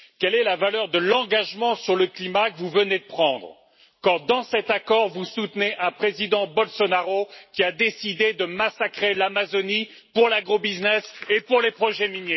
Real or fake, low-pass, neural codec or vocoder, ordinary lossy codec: real; 7.2 kHz; none; MP3, 24 kbps